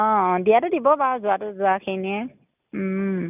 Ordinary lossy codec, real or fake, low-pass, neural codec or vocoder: none; real; 3.6 kHz; none